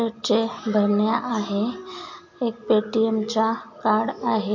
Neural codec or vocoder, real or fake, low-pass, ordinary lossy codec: none; real; 7.2 kHz; MP3, 48 kbps